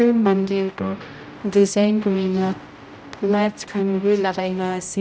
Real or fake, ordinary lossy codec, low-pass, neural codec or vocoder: fake; none; none; codec, 16 kHz, 0.5 kbps, X-Codec, HuBERT features, trained on general audio